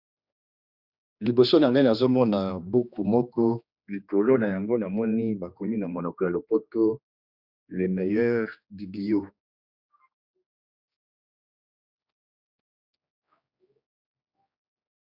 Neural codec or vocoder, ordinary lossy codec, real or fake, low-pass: codec, 16 kHz, 2 kbps, X-Codec, HuBERT features, trained on general audio; Opus, 64 kbps; fake; 5.4 kHz